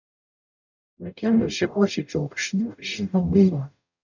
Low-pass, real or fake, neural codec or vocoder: 7.2 kHz; fake; codec, 44.1 kHz, 0.9 kbps, DAC